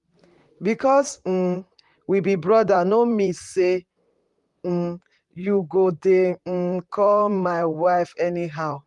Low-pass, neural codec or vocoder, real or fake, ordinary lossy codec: 10.8 kHz; vocoder, 44.1 kHz, 128 mel bands, Pupu-Vocoder; fake; Opus, 32 kbps